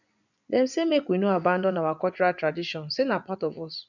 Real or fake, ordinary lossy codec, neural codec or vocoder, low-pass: fake; none; vocoder, 24 kHz, 100 mel bands, Vocos; 7.2 kHz